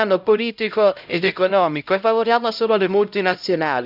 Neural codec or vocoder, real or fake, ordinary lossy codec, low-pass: codec, 16 kHz, 0.5 kbps, X-Codec, HuBERT features, trained on LibriSpeech; fake; none; 5.4 kHz